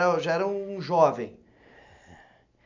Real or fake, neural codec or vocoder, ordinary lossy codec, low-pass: real; none; MP3, 48 kbps; 7.2 kHz